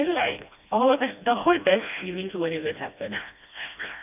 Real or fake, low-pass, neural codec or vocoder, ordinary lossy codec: fake; 3.6 kHz; codec, 16 kHz, 1 kbps, FreqCodec, smaller model; none